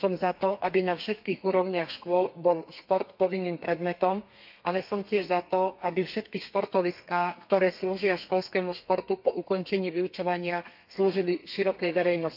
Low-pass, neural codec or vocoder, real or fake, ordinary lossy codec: 5.4 kHz; codec, 32 kHz, 1.9 kbps, SNAC; fake; MP3, 48 kbps